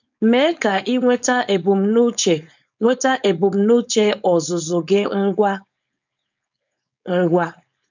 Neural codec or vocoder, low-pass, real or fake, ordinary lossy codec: codec, 16 kHz, 4.8 kbps, FACodec; 7.2 kHz; fake; none